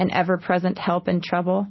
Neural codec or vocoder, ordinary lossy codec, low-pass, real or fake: none; MP3, 24 kbps; 7.2 kHz; real